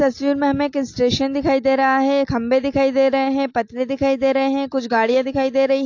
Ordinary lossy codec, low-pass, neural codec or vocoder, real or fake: AAC, 48 kbps; 7.2 kHz; none; real